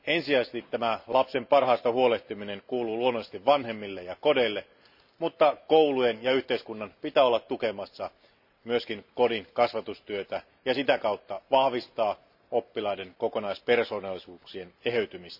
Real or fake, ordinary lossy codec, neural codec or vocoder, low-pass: real; none; none; 5.4 kHz